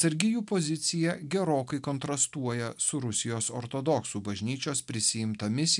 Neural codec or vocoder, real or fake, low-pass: none; real; 10.8 kHz